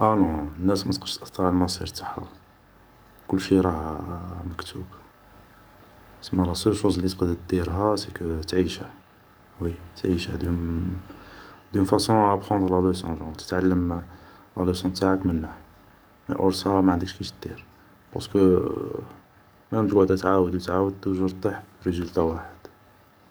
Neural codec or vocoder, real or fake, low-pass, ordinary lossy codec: codec, 44.1 kHz, 7.8 kbps, DAC; fake; none; none